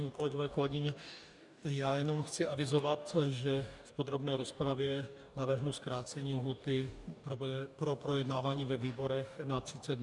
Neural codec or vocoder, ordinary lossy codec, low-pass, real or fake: codec, 44.1 kHz, 2.6 kbps, DAC; MP3, 96 kbps; 10.8 kHz; fake